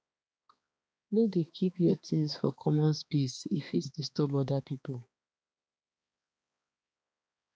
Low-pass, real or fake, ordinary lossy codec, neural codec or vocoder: none; fake; none; codec, 16 kHz, 2 kbps, X-Codec, HuBERT features, trained on balanced general audio